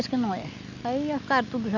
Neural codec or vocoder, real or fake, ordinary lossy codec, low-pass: none; real; none; 7.2 kHz